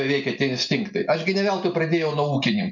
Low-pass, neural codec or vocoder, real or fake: 7.2 kHz; none; real